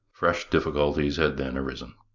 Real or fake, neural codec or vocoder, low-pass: real; none; 7.2 kHz